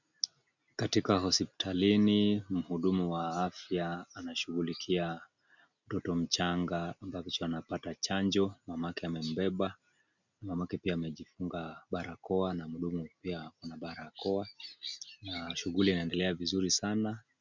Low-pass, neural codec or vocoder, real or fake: 7.2 kHz; none; real